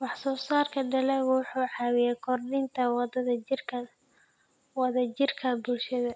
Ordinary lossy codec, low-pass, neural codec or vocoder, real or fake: none; none; none; real